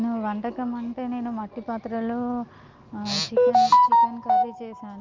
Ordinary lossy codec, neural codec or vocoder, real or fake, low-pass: Opus, 24 kbps; none; real; 7.2 kHz